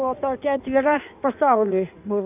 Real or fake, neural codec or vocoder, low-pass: fake; codec, 16 kHz in and 24 kHz out, 1.1 kbps, FireRedTTS-2 codec; 3.6 kHz